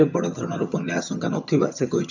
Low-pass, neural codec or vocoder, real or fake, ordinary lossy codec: 7.2 kHz; vocoder, 22.05 kHz, 80 mel bands, HiFi-GAN; fake; none